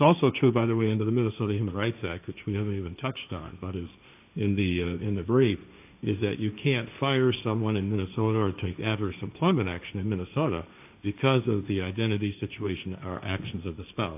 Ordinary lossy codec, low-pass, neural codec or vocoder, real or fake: AAC, 32 kbps; 3.6 kHz; codec, 16 kHz, 1.1 kbps, Voila-Tokenizer; fake